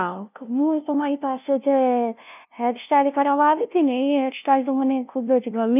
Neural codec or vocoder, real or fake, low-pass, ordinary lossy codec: codec, 16 kHz, 0.5 kbps, FunCodec, trained on LibriTTS, 25 frames a second; fake; 3.6 kHz; none